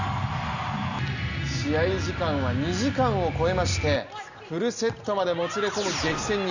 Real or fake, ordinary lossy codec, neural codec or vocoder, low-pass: real; none; none; 7.2 kHz